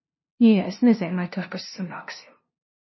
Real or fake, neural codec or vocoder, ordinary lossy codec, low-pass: fake; codec, 16 kHz, 0.5 kbps, FunCodec, trained on LibriTTS, 25 frames a second; MP3, 24 kbps; 7.2 kHz